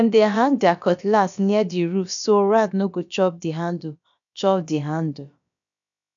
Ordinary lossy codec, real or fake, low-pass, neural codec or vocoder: none; fake; 7.2 kHz; codec, 16 kHz, about 1 kbps, DyCAST, with the encoder's durations